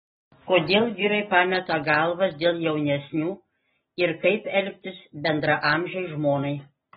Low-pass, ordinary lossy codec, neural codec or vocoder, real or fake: 19.8 kHz; AAC, 16 kbps; none; real